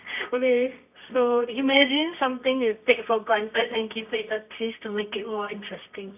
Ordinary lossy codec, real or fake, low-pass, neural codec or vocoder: none; fake; 3.6 kHz; codec, 24 kHz, 0.9 kbps, WavTokenizer, medium music audio release